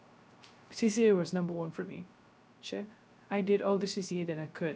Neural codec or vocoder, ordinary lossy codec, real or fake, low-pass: codec, 16 kHz, 0.3 kbps, FocalCodec; none; fake; none